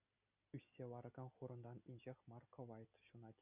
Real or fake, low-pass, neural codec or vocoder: real; 3.6 kHz; none